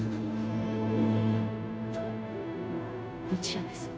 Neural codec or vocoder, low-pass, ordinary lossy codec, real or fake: codec, 16 kHz, 0.5 kbps, FunCodec, trained on Chinese and English, 25 frames a second; none; none; fake